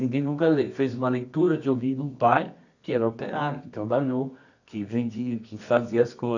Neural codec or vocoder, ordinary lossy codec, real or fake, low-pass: codec, 24 kHz, 0.9 kbps, WavTokenizer, medium music audio release; none; fake; 7.2 kHz